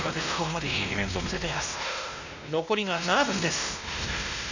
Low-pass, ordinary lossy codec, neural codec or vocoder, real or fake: 7.2 kHz; none; codec, 16 kHz, 1 kbps, X-Codec, WavLM features, trained on Multilingual LibriSpeech; fake